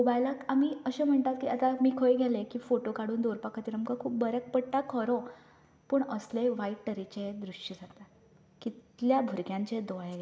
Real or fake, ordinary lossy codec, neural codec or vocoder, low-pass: real; none; none; none